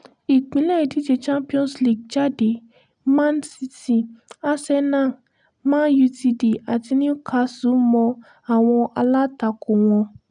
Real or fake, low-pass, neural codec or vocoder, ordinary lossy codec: real; 9.9 kHz; none; none